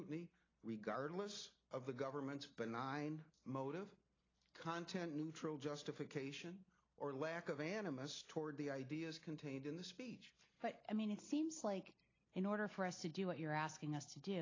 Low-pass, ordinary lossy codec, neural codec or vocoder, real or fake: 7.2 kHz; AAC, 32 kbps; none; real